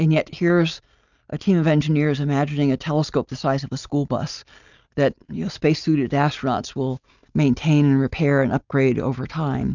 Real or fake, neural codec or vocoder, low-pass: real; none; 7.2 kHz